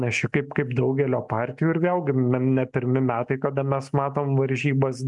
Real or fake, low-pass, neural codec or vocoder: real; 10.8 kHz; none